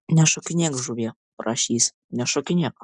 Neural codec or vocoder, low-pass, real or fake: none; 9.9 kHz; real